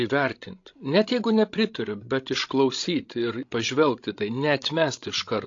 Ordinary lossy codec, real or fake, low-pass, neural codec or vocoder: AAC, 48 kbps; fake; 7.2 kHz; codec, 16 kHz, 16 kbps, FreqCodec, larger model